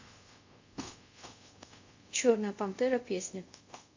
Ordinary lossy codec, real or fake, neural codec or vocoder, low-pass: AAC, 48 kbps; fake; codec, 24 kHz, 0.5 kbps, DualCodec; 7.2 kHz